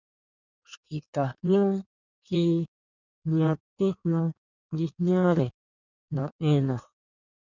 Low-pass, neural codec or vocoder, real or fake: 7.2 kHz; codec, 16 kHz in and 24 kHz out, 1.1 kbps, FireRedTTS-2 codec; fake